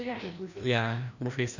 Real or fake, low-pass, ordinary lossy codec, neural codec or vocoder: fake; 7.2 kHz; none; codec, 16 kHz, 1 kbps, FreqCodec, larger model